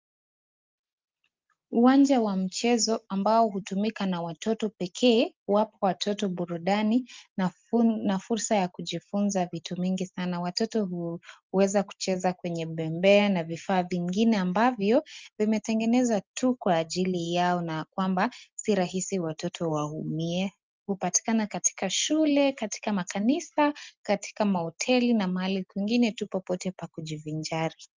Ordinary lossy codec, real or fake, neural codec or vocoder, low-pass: Opus, 24 kbps; real; none; 7.2 kHz